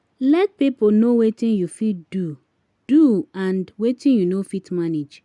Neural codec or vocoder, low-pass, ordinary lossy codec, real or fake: none; 10.8 kHz; none; real